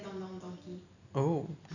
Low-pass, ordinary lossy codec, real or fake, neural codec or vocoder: 7.2 kHz; none; real; none